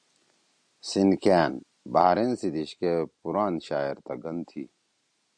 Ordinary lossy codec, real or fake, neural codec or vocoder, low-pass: MP3, 64 kbps; real; none; 9.9 kHz